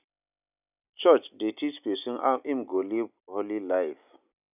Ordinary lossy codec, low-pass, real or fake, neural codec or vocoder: none; 3.6 kHz; real; none